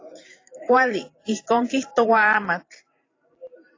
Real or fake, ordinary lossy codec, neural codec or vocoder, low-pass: fake; AAC, 32 kbps; vocoder, 44.1 kHz, 128 mel bands every 512 samples, BigVGAN v2; 7.2 kHz